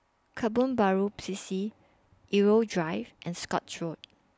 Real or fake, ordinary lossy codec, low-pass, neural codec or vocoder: real; none; none; none